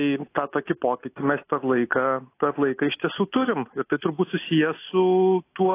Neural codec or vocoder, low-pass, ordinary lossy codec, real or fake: none; 3.6 kHz; AAC, 24 kbps; real